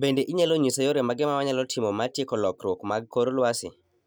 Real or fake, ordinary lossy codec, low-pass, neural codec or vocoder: real; none; none; none